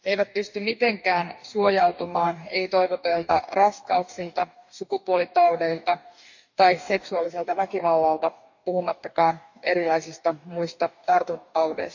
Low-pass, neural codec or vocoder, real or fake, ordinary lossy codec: 7.2 kHz; codec, 44.1 kHz, 2.6 kbps, DAC; fake; none